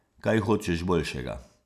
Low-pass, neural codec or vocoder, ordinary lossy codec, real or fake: 14.4 kHz; none; none; real